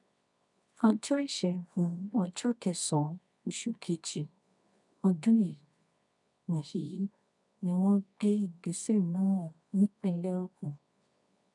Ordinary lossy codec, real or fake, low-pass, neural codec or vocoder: none; fake; 10.8 kHz; codec, 24 kHz, 0.9 kbps, WavTokenizer, medium music audio release